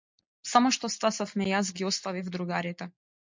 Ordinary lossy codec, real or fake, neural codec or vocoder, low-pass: MP3, 64 kbps; real; none; 7.2 kHz